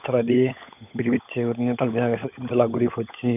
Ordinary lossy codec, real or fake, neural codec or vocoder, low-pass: none; fake; codec, 16 kHz, 16 kbps, FunCodec, trained on LibriTTS, 50 frames a second; 3.6 kHz